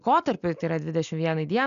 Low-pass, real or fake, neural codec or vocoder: 7.2 kHz; real; none